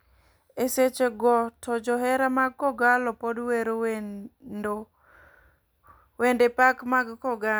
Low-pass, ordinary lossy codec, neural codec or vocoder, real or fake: none; none; none; real